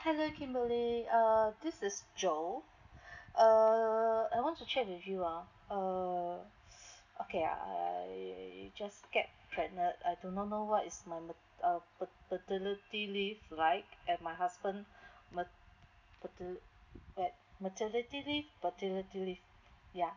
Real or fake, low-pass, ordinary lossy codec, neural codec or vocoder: real; 7.2 kHz; none; none